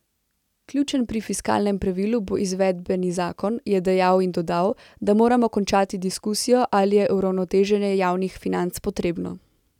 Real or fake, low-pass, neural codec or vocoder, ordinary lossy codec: real; 19.8 kHz; none; none